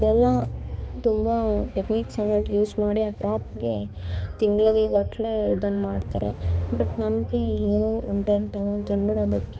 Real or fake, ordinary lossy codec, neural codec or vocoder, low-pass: fake; none; codec, 16 kHz, 2 kbps, X-Codec, HuBERT features, trained on balanced general audio; none